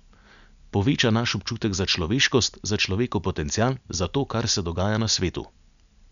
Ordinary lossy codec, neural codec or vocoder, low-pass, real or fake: none; none; 7.2 kHz; real